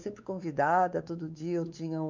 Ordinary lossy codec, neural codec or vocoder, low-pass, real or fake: none; codec, 16 kHz, 4 kbps, X-Codec, WavLM features, trained on Multilingual LibriSpeech; 7.2 kHz; fake